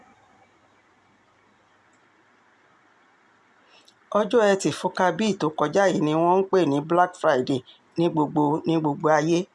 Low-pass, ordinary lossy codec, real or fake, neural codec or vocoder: none; none; real; none